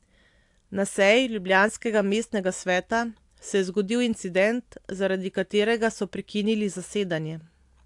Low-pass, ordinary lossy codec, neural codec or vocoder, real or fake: 10.8 kHz; AAC, 64 kbps; none; real